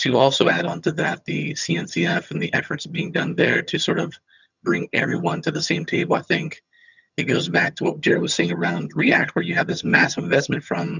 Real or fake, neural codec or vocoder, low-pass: fake; vocoder, 22.05 kHz, 80 mel bands, HiFi-GAN; 7.2 kHz